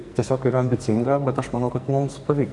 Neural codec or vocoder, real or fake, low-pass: codec, 44.1 kHz, 2.6 kbps, SNAC; fake; 10.8 kHz